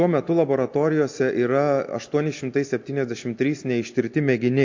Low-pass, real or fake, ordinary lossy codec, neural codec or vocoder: 7.2 kHz; real; MP3, 48 kbps; none